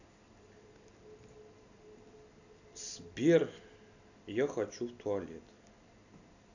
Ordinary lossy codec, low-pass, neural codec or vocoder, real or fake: none; 7.2 kHz; none; real